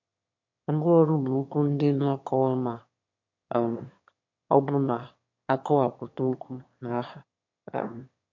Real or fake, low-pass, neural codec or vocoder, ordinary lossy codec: fake; 7.2 kHz; autoencoder, 22.05 kHz, a latent of 192 numbers a frame, VITS, trained on one speaker; MP3, 48 kbps